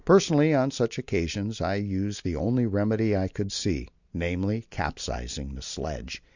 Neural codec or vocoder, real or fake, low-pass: none; real; 7.2 kHz